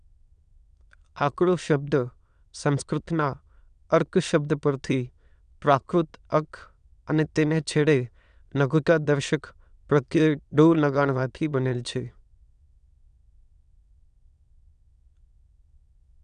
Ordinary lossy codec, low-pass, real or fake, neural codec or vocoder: none; 9.9 kHz; fake; autoencoder, 22.05 kHz, a latent of 192 numbers a frame, VITS, trained on many speakers